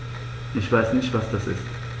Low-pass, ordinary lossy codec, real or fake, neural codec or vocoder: none; none; real; none